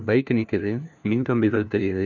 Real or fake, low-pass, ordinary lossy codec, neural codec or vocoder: fake; 7.2 kHz; none; codec, 16 kHz, 1 kbps, FunCodec, trained on LibriTTS, 50 frames a second